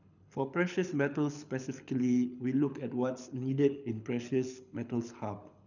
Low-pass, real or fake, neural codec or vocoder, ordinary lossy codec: 7.2 kHz; fake; codec, 24 kHz, 6 kbps, HILCodec; none